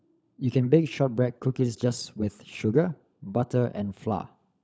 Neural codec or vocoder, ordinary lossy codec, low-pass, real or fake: codec, 16 kHz, 16 kbps, FunCodec, trained on LibriTTS, 50 frames a second; none; none; fake